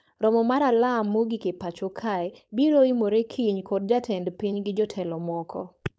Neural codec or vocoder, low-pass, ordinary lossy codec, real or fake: codec, 16 kHz, 4.8 kbps, FACodec; none; none; fake